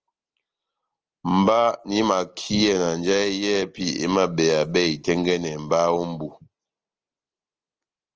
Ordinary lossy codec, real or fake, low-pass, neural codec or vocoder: Opus, 24 kbps; real; 7.2 kHz; none